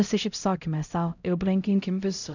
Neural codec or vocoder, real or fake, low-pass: codec, 16 kHz, 0.5 kbps, X-Codec, HuBERT features, trained on LibriSpeech; fake; 7.2 kHz